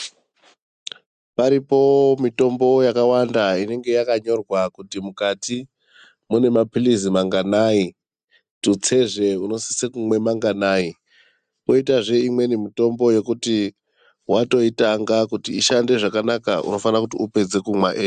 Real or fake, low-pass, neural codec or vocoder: real; 9.9 kHz; none